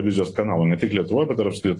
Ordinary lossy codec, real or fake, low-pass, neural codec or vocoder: AAC, 32 kbps; real; 10.8 kHz; none